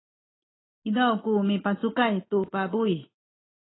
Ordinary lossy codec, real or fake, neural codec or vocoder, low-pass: AAC, 16 kbps; real; none; 7.2 kHz